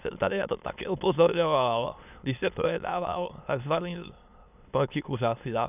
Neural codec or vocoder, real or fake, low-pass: autoencoder, 22.05 kHz, a latent of 192 numbers a frame, VITS, trained on many speakers; fake; 3.6 kHz